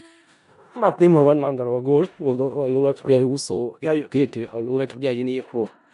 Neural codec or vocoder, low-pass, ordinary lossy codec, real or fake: codec, 16 kHz in and 24 kHz out, 0.4 kbps, LongCat-Audio-Codec, four codebook decoder; 10.8 kHz; none; fake